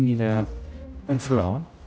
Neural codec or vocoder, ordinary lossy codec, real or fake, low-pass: codec, 16 kHz, 0.5 kbps, X-Codec, HuBERT features, trained on general audio; none; fake; none